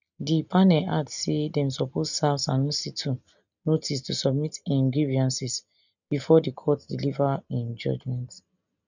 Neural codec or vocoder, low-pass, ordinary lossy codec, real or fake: none; 7.2 kHz; none; real